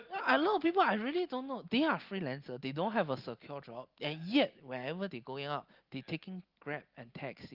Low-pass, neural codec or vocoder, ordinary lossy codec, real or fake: 5.4 kHz; none; Opus, 32 kbps; real